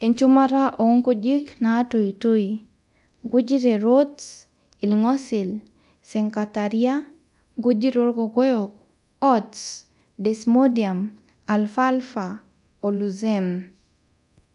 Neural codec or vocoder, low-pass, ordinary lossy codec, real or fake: codec, 24 kHz, 0.9 kbps, DualCodec; 10.8 kHz; none; fake